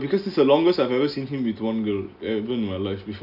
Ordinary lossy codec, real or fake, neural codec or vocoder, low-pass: none; real; none; 5.4 kHz